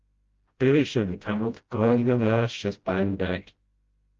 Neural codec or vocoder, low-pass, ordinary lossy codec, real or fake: codec, 16 kHz, 0.5 kbps, FreqCodec, smaller model; 7.2 kHz; Opus, 32 kbps; fake